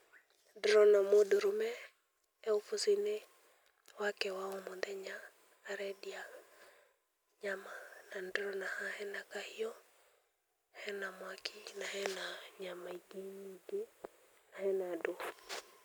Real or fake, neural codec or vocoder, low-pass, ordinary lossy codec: real; none; none; none